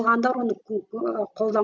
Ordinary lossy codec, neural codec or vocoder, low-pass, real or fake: none; none; 7.2 kHz; real